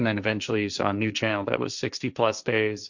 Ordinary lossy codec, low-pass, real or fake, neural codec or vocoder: Opus, 64 kbps; 7.2 kHz; fake; codec, 16 kHz, 1.1 kbps, Voila-Tokenizer